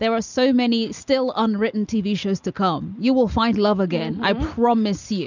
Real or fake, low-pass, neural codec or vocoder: real; 7.2 kHz; none